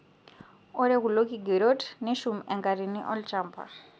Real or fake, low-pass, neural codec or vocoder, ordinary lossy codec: real; none; none; none